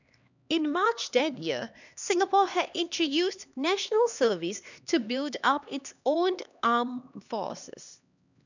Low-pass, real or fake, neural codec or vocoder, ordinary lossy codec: 7.2 kHz; fake; codec, 16 kHz, 2 kbps, X-Codec, HuBERT features, trained on LibriSpeech; none